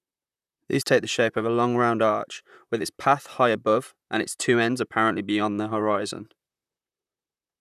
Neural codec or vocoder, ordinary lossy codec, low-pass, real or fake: vocoder, 44.1 kHz, 128 mel bands, Pupu-Vocoder; none; 14.4 kHz; fake